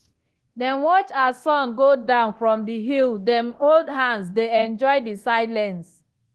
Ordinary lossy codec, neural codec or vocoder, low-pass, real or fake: Opus, 16 kbps; codec, 24 kHz, 0.9 kbps, DualCodec; 10.8 kHz; fake